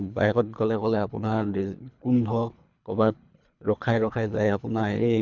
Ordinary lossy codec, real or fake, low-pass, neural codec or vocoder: none; fake; 7.2 kHz; codec, 24 kHz, 3 kbps, HILCodec